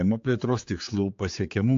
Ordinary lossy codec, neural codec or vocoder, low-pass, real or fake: AAC, 48 kbps; codec, 16 kHz, 4 kbps, X-Codec, HuBERT features, trained on general audio; 7.2 kHz; fake